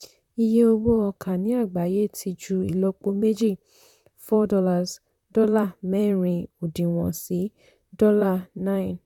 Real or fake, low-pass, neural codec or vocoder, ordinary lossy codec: fake; 19.8 kHz; vocoder, 44.1 kHz, 128 mel bands, Pupu-Vocoder; none